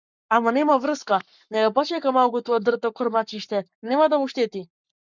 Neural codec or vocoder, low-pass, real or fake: codec, 44.1 kHz, 7.8 kbps, Pupu-Codec; 7.2 kHz; fake